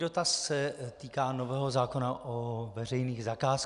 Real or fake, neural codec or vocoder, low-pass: real; none; 10.8 kHz